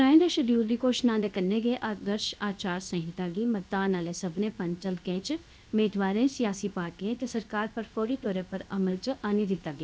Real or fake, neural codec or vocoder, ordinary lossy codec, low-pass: fake; codec, 16 kHz, 0.7 kbps, FocalCodec; none; none